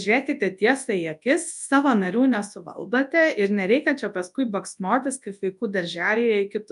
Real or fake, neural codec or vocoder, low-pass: fake; codec, 24 kHz, 0.9 kbps, WavTokenizer, large speech release; 10.8 kHz